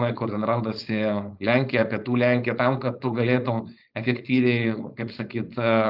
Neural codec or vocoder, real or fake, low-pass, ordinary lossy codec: codec, 16 kHz, 4.8 kbps, FACodec; fake; 5.4 kHz; Opus, 24 kbps